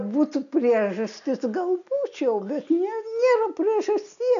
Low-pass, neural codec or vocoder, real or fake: 7.2 kHz; none; real